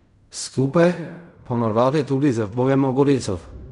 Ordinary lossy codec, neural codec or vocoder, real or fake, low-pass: none; codec, 16 kHz in and 24 kHz out, 0.4 kbps, LongCat-Audio-Codec, fine tuned four codebook decoder; fake; 10.8 kHz